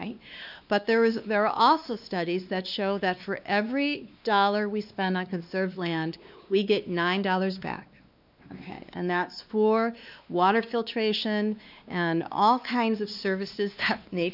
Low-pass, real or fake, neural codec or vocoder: 5.4 kHz; fake; codec, 16 kHz, 2 kbps, X-Codec, WavLM features, trained on Multilingual LibriSpeech